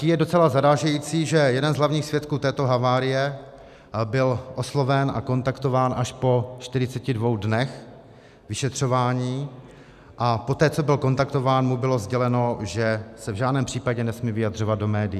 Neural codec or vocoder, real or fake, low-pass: none; real; 14.4 kHz